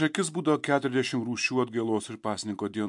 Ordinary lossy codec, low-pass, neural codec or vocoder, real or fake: MP3, 64 kbps; 10.8 kHz; none; real